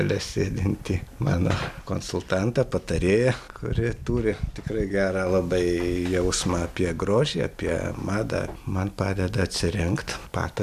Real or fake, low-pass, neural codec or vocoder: real; 14.4 kHz; none